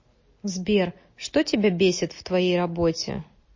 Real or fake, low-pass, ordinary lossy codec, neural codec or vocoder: real; 7.2 kHz; MP3, 32 kbps; none